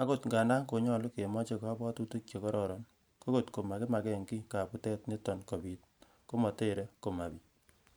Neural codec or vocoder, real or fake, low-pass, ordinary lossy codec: vocoder, 44.1 kHz, 128 mel bands every 512 samples, BigVGAN v2; fake; none; none